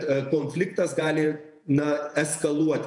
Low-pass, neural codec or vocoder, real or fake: 10.8 kHz; vocoder, 48 kHz, 128 mel bands, Vocos; fake